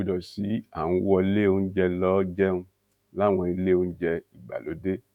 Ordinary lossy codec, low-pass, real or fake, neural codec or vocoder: none; 19.8 kHz; fake; autoencoder, 48 kHz, 128 numbers a frame, DAC-VAE, trained on Japanese speech